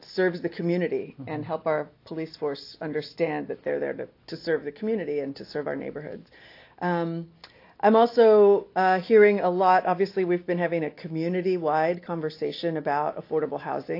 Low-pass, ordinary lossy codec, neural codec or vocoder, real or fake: 5.4 kHz; AAC, 32 kbps; none; real